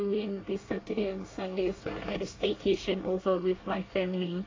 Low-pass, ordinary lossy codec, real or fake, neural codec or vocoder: 7.2 kHz; AAC, 32 kbps; fake; codec, 24 kHz, 1 kbps, SNAC